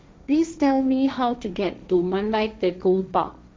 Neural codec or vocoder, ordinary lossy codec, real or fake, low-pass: codec, 16 kHz, 1.1 kbps, Voila-Tokenizer; none; fake; none